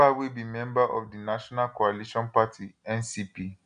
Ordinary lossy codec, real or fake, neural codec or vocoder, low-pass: none; real; none; 9.9 kHz